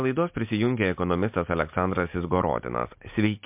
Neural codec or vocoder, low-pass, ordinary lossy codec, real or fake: none; 3.6 kHz; MP3, 32 kbps; real